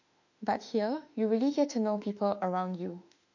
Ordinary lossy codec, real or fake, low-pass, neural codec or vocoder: none; fake; 7.2 kHz; autoencoder, 48 kHz, 32 numbers a frame, DAC-VAE, trained on Japanese speech